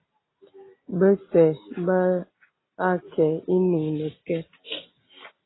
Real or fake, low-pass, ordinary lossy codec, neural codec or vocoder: real; 7.2 kHz; AAC, 16 kbps; none